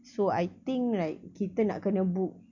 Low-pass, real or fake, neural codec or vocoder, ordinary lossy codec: 7.2 kHz; real; none; none